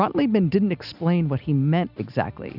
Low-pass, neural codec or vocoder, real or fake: 5.4 kHz; none; real